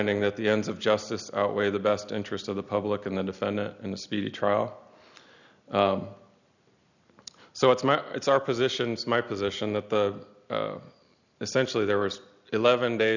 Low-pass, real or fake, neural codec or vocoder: 7.2 kHz; real; none